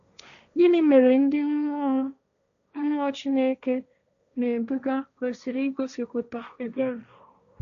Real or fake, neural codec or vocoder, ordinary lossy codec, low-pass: fake; codec, 16 kHz, 1.1 kbps, Voila-Tokenizer; none; 7.2 kHz